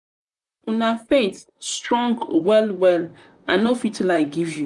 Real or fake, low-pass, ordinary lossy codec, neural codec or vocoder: fake; 10.8 kHz; none; vocoder, 44.1 kHz, 128 mel bands, Pupu-Vocoder